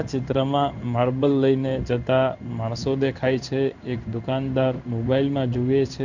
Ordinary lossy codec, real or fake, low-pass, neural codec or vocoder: none; fake; 7.2 kHz; codec, 16 kHz in and 24 kHz out, 1 kbps, XY-Tokenizer